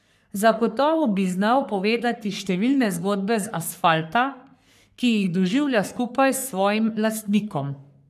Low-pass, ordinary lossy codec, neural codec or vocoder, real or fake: 14.4 kHz; none; codec, 44.1 kHz, 3.4 kbps, Pupu-Codec; fake